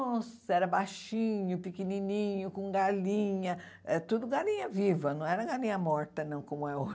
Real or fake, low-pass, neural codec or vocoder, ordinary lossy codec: real; none; none; none